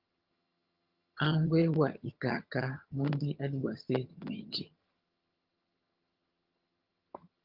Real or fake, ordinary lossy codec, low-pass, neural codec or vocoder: fake; Opus, 32 kbps; 5.4 kHz; vocoder, 22.05 kHz, 80 mel bands, HiFi-GAN